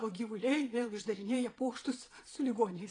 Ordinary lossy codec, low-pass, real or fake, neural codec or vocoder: AAC, 32 kbps; 9.9 kHz; fake; vocoder, 22.05 kHz, 80 mel bands, Vocos